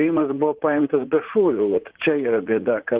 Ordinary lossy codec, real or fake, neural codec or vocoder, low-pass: Opus, 16 kbps; fake; vocoder, 44.1 kHz, 80 mel bands, Vocos; 3.6 kHz